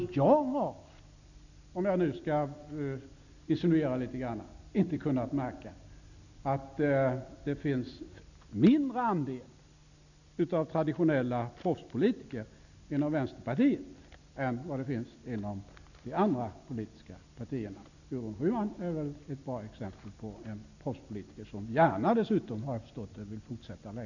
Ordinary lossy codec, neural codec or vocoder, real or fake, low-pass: none; none; real; 7.2 kHz